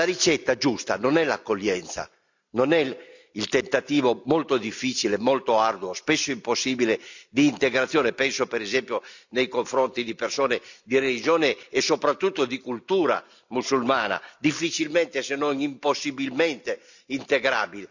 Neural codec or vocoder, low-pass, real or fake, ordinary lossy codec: none; 7.2 kHz; real; none